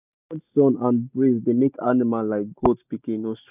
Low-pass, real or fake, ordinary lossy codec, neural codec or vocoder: 3.6 kHz; real; none; none